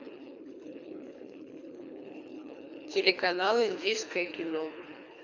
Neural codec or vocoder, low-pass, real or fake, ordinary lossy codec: codec, 24 kHz, 3 kbps, HILCodec; 7.2 kHz; fake; none